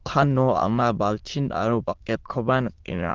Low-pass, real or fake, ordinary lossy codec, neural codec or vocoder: 7.2 kHz; fake; Opus, 16 kbps; autoencoder, 22.05 kHz, a latent of 192 numbers a frame, VITS, trained on many speakers